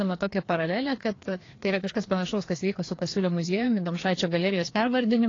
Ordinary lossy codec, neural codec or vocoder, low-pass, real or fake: AAC, 32 kbps; codec, 16 kHz, 2 kbps, FreqCodec, larger model; 7.2 kHz; fake